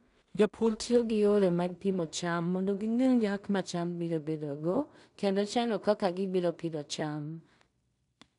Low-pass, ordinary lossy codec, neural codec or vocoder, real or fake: 10.8 kHz; none; codec, 16 kHz in and 24 kHz out, 0.4 kbps, LongCat-Audio-Codec, two codebook decoder; fake